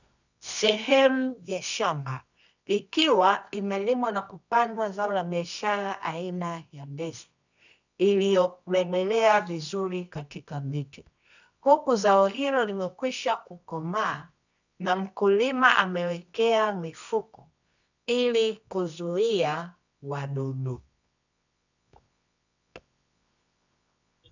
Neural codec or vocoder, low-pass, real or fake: codec, 24 kHz, 0.9 kbps, WavTokenizer, medium music audio release; 7.2 kHz; fake